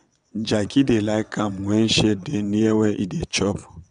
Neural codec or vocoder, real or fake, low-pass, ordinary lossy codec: vocoder, 22.05 kHz, 80 mel bands, WaveNeXt; fake; 9.9 kHz; none